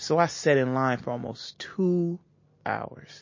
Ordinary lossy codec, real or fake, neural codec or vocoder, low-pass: MP3, 32 kbps; real; none; 7.2 kHz